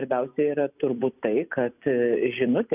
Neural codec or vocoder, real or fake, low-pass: none; real; 3.6 kHz